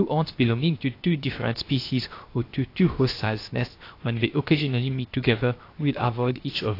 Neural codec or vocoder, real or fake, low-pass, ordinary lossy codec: codec, 16 kHz, 0.7 kbps, FocalCodec; fake; 5.4 kHz; AAC, 32 kbps